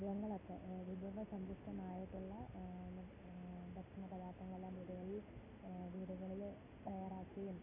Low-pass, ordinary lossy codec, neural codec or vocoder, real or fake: 3.6 kHz; none; none; real